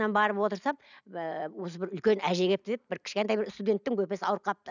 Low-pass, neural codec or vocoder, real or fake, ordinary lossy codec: 7.2 kHz; none; real; none